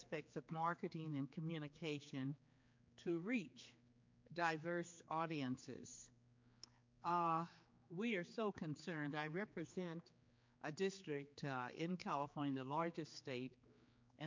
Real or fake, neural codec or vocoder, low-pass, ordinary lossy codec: fake; codec, 16 kHz, 4 kbps, X-Codec, HuBERT features, trained on general audio; 7.2 kHz; MP3, 48 kbps